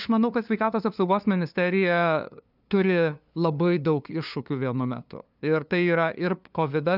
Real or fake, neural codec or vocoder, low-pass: fake; codec, 16 kHz, 2 kbps, FunCodec, trained on LibriTTS, 25 frames a second; 5.4 kHz